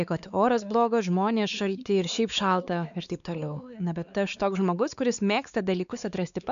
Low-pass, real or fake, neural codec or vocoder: 7.2 kHz; fake; codec, 16 kHz, 4 kbps, X-Codec, WavLM features, trained on Multilingual LibriSpeech